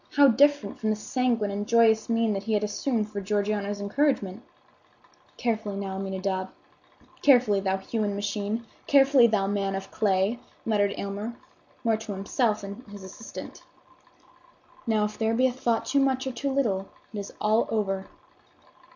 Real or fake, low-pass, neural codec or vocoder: real; 7.2 kHz; none